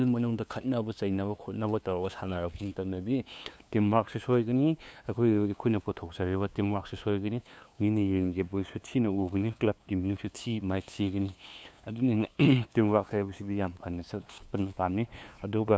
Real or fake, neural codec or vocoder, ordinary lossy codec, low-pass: fake; codec, 16 kHz, 2 kbps, FunCodec, trained on LibriTTS, 25 frames a second; none; none